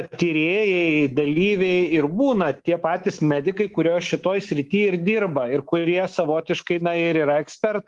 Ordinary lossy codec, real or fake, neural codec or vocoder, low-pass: Opus, 24 kbps; fake; codec, 24 kHz, 3.1 kbps, DualCodec; 10.8 kHz